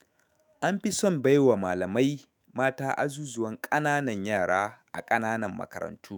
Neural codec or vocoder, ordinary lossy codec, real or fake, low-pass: autoencoder, 48 kHz, 128 numbers a frame, DAC-VAE, trained on Japanese speech; none; fake; none